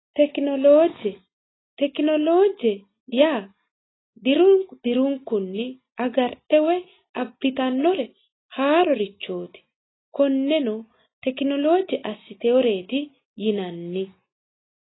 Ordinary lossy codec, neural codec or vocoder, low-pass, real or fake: AAC, 16 kbps; none; 7.2 kHz; real